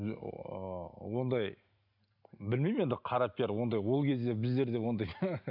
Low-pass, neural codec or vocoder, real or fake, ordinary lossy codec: 5.4 kHz; none; real; none